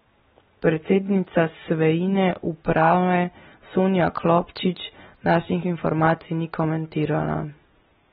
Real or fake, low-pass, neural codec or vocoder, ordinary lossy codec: real; 19.8 kHz; none; AAC, 16 kbps